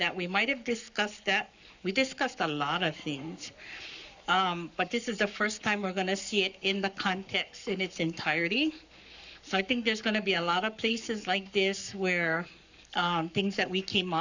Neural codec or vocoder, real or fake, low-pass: codec, 44.1 kHz, 7.8 kbps, Pupu-Codec; fake; 7.2 kHz